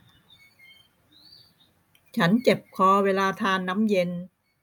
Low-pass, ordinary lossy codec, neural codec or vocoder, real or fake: 19.8 kHz; none; none; real